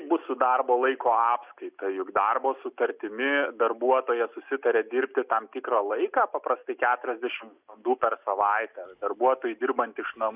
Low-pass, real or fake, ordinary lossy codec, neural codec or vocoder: 3.6 kHz; real; Opus, 64 kbps; none